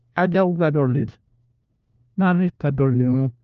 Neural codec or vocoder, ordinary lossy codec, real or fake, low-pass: codec, 16 kHz, 1 kbps, FunCodec, trained on LibriTTS, 50 frames a second; Opus, 24 kbps; fake; 7.2 kHz